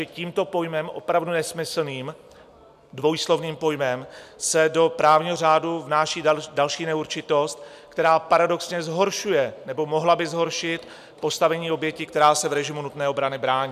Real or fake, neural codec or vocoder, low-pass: real; none; 14.4 kHz